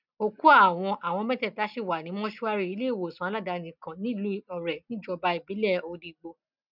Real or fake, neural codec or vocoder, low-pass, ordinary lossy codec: real; none; 5.4 kHz; none